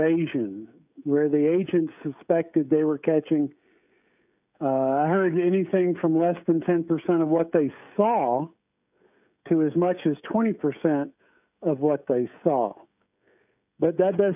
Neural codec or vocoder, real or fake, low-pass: codec, 16 kHz, 16 kbps, FreqCodec, smaller model; fake; 3.6 kHz